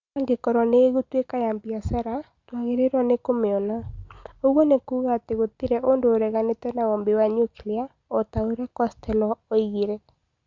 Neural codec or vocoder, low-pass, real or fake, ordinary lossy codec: none; 7.2 kHz; real; Opus, 64 kbps